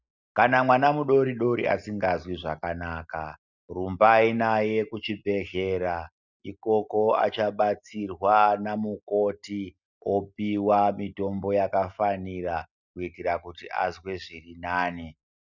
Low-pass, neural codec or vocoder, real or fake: 7.2 kHz; none; real